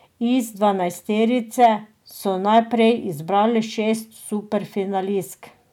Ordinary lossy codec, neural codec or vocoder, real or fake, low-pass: none; none; real; 19.8 kHz